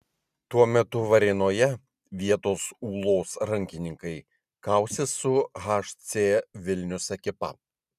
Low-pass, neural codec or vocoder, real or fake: 14.4 kHz; none; real